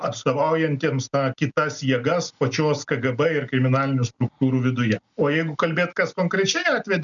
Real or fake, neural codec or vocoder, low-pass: real; none; 7.2 kHz